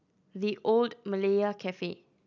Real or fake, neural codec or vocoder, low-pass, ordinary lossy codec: real; none; 7.2 kHz; none